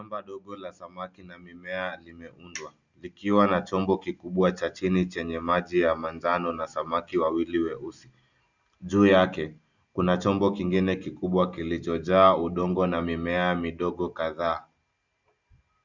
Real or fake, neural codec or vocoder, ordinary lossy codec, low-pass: real; none; Opus, 64 kbps; 7.2 kHz